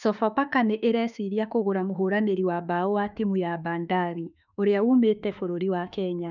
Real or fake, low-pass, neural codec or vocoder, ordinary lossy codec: fake; 7.2 kHz; autoencoder, 48 kHz, 32 numbers a frame, DAC-VAE, trained on Japanese speech; none